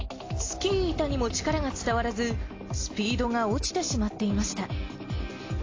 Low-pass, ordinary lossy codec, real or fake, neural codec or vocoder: 7.2 kHz; AAC, 32 kbps; real; none